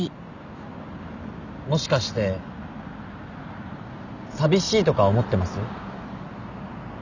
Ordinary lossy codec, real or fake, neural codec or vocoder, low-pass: none; real; none; 7.2 kHz